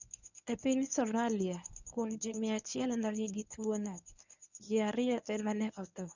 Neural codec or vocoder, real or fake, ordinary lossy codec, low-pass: codec, 24 kHz, 0.9 kbps, WavTokenizer, medium speech release version 1; fake; none; 7.2 kHz